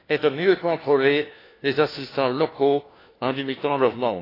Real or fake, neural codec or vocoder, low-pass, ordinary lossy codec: fake; codec, 16 kHz, 1 kbps, FunCodec, trained on LibriTTS, 50 frames a second; 5.4 kHz; AAC, 24 kbps